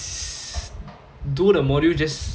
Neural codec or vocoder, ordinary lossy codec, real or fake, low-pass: none; none; real; none